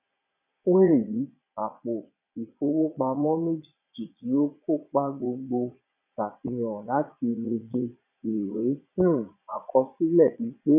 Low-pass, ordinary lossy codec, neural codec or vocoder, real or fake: 3.6 kHz; none; vocoder, 22.05 kHz, 80 mel bands, Vocos; fake